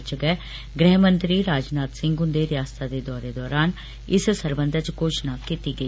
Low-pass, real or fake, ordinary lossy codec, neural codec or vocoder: none; real; none; none